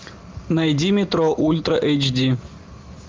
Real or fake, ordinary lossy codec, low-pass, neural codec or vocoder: real; Opus, 32 kbps; 7.2 kHz; none